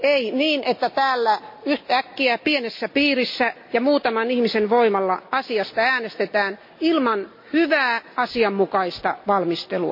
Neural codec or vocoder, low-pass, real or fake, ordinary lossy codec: none; 5.4 kHz; real; MP3, 32 kbps